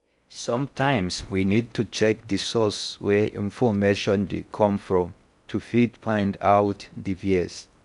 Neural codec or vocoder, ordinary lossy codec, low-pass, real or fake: codec, 16 kHz in and 24 kHz out, 0.6 kbps, FocalCodec, streaming, 4096 codes; none; 10.8 kHz; fake